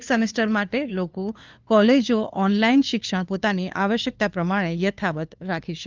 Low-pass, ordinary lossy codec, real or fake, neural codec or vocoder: 7.2 kHz; Opus, 24 kbps; fake; codec, 16 kHz, 2 kbps, FunCodec, trained on LibriTTS, 25 frames a second